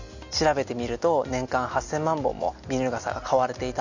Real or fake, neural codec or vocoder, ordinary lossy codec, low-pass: real; none; none; 7.2 kHz